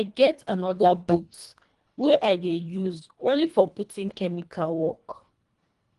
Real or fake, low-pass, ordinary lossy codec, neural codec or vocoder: fake; 10.8 kHz; Opus, 24 kbps; codec, 24 kHz, 1.5 kbps, HILCodec